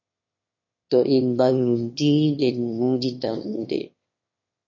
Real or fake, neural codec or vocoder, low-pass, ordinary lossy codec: fake; autoencoder, 22.05 kHz, a latent of 192 numbers a frame, VITS, trained on one speaker; 7.2 kHz; MP3, 32 kbps